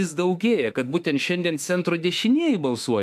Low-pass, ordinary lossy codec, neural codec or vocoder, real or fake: 14.4 kHz; AAC, 96 kbps; autoencoder, 48 kHz, 32 numbers a frame, DAC-VAE, trained on Japanese speech; fake